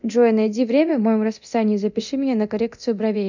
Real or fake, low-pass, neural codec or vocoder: fake; 7.2 kHz; codec, 24 kHz, 0.9 kbps, DualCodec